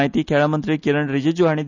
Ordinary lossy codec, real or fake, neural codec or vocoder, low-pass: none; real; none; 7.2 kHz